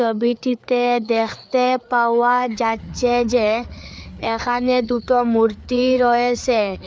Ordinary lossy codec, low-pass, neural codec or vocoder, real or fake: none; none; codec, 16 kHz, 4 kbps, FreqCodec, larger model; fake